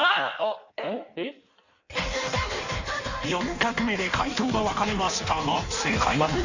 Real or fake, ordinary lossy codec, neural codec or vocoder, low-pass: fake; none; codec, 16 kHz in and 24 kHz out, 1.1 kbps, FireRedTTS-2 codec; 7.2 kHz